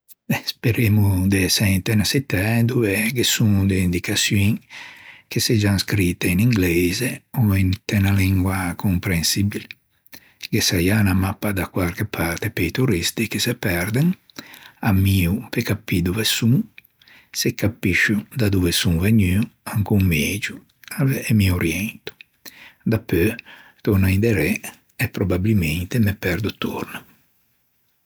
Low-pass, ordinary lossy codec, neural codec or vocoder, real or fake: none; none; none; real